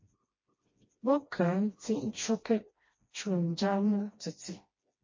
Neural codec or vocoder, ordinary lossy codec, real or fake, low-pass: codec, 16 kHz, 1 kbps, FreqCodec, smaller model; MP3, 32 kbps; fake; 7.2 kHz